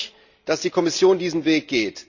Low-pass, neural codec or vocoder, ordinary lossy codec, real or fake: 7.2 kHz; none; Opus, 64 kbps; real